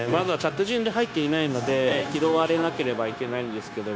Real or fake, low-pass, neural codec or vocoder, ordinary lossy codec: fake; none; codec, 16 kHz, 0.9 kbps, LongCat-Audio-Codec; none